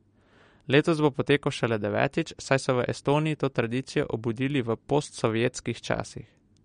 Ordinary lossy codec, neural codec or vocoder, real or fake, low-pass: MP3, 48 kbps; none; real; 9.9 kHz